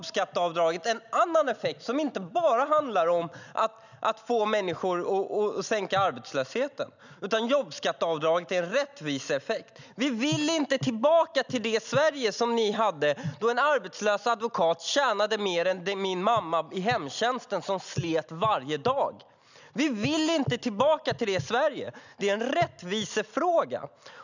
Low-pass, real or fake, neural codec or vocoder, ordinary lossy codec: 7.2 kHz; real; none; none